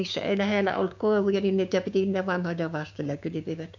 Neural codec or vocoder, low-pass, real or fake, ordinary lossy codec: codec, 16 kHz, 6 kbps, DAC; 7.2 kHz; fake; none